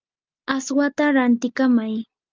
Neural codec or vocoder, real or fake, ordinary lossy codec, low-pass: none; real; Opus, 24 kbps; 7.2 kHz